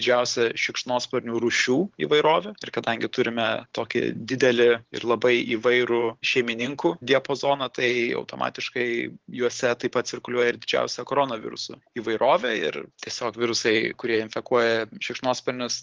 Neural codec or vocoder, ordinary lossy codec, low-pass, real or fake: vocoder, 44.1 kHz, 128 mel bands every 512 samples, BigVGAN v2; Opus, 16 kbps; 7.2 kHz; fake